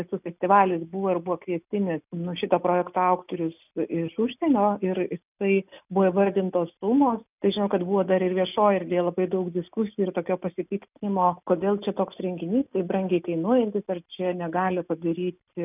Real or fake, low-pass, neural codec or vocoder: real; 3.6 kHz; none